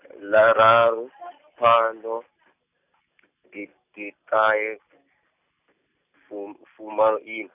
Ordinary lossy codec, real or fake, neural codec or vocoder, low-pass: none; real; none; 3.6 kHz